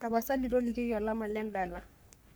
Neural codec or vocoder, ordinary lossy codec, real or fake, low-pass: codec, 44.1 kHz, 3.4 kbps, Pupu-Codec; none; fake; none